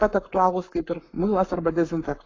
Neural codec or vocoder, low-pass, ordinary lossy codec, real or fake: codec, 44.1 kHz, 7.8 kbps, Pupu-Codec; 7.2 kHz; AAC, 32 kbps; fake